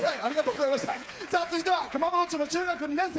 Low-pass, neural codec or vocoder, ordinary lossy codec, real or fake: none; codec, 16 kHz, 4 kbps, FreqCodec, smaller model; none; fake